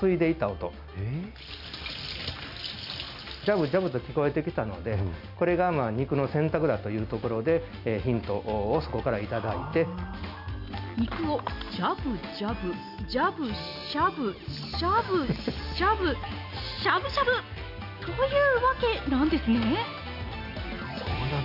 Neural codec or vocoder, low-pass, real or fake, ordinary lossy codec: none; 5.4 kHz; real; none